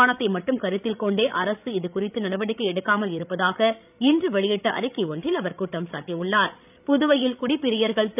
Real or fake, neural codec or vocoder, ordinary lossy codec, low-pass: fake; codec, 16 kHz, 16 kbps, FreqCodec, larger model; none; 3.6 kHz